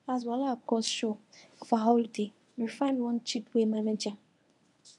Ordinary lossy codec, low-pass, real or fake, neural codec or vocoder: none; 10.8 kHz; fake; codec, 24 kHz, 0.9 kbps, WavTokenizer, medium speech release version 1